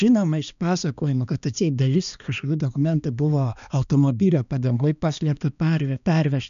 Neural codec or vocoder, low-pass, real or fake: codec, 16 kHz, 2 kbps, X-Codec, HuBERT features, trained on balanced general audio; 7.2 kHz; fake